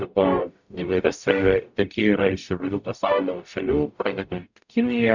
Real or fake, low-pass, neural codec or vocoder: fake; 7.2 kHz; codec, 44.1 kHz, 0.9 kbps, DAC